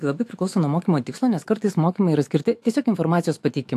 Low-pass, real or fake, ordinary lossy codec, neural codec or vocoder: 14.4 kHz; fake; AAC, 64 kbps; autoencoder, 48 kHz, 128 numbers a frame, DAC-VAE, trained on Japanese speech